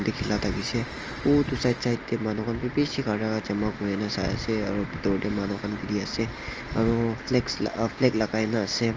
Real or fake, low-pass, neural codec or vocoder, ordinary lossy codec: real; 7.2 kHz; none; Opus, 24 kbps